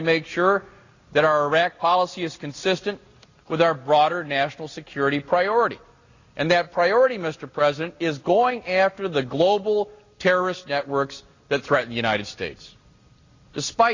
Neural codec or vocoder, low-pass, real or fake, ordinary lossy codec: none; 7.2 kHz; real; Opus, 64 kbps